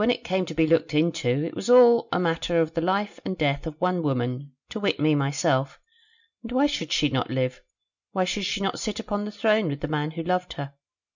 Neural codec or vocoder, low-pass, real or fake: none; 7.2 kHz; real